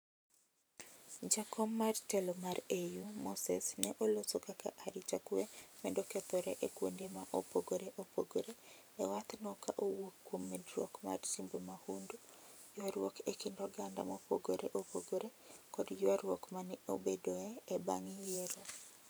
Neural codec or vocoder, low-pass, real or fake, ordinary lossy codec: vocoder, 44.1 kHz, 128 mel bands every 512 samples, BigVGAN v2; none; fake; none